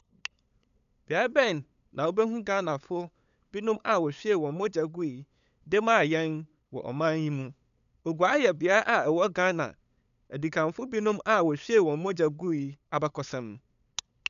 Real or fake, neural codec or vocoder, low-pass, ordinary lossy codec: fake; codec, 16 kHz, 8 kbps, FunCodec, trained on LibriTTS, 25 frames a second; 7.2 kHz; none